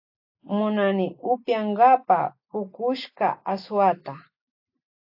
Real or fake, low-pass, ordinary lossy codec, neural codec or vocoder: real; 5.4 kHz; AAC, 48 kbps; none